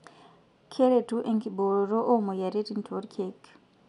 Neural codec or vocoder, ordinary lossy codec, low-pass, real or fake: none; none; 10.8 kHz; real